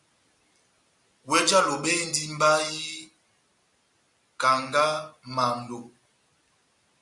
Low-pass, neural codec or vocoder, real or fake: 10.8 kHz; none; real